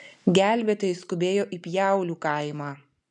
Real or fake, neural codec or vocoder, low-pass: real; none; 10.8 kHz